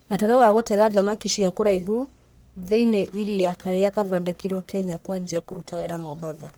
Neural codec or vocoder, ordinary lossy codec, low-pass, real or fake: codec, 44.1 kHz, 1.7 kbps, Pupu-Codec; none; none; fake